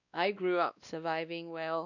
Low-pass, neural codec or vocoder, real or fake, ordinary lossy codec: 7.2 kHz; codec, 16 kHz, 1 kbps, X-Codec, WavLM features, trained on Multilingual LibriSpeech; fake; none